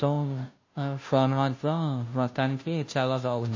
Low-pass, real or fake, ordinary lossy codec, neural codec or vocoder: 7.2 kHz; fake; MP3, 32 kbps; codec, 16 kHz, 0.5 kbps, FunCodec, trained on Chinese and English, 25 frames a second